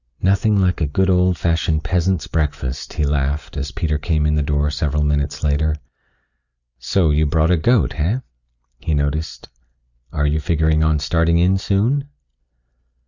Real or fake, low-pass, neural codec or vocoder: real; 7.2 kHz; none